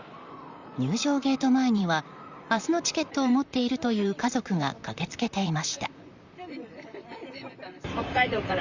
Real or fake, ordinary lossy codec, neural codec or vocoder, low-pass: fake; Opus, 64 kbps; vocoder, 44.1 kHz, 128 mel bands, Pupu-Vocoder; 7.2 kHz